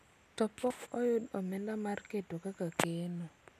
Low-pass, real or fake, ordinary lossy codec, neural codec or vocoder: 14.4 kHz; real; none; none